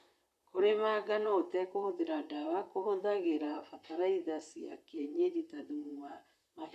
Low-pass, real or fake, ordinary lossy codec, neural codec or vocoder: 14.4 kHz; fake; none; vocoder, 44.1 kHz, 128 mel bands, Pupu-Vocoder